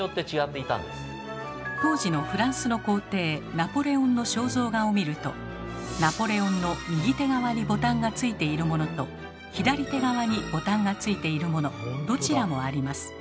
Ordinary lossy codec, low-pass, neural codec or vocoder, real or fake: none; none; none; real